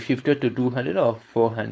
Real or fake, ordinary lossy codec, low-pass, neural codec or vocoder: fake; none; none; codec, 16 kHz, 4.8 kbps, FACodec